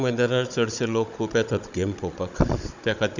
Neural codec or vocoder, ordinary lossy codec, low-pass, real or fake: codec, 16 kHz, 16 kbps, FunCodec, trained on Chinese and English, 50 frames a second; none; 7.2 kHz; fake